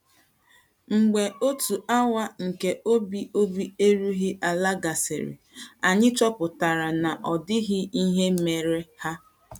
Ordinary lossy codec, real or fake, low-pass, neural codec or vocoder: none; real; 19.8 kHz; none